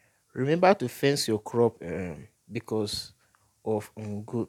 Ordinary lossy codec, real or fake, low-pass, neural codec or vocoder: none; real; 19.8 kHz; none